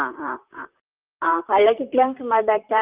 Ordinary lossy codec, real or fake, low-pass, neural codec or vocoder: Opus, 24 kbps; fake; 3.6 kHz; vocoder, 44.1 kHz, 128 mel bands, Pupu-Vocoder